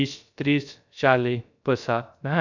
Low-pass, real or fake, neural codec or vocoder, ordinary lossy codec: 7.2 kHz; fake; codec, 16 kHz, 0.3 kbps, FocalCodec; none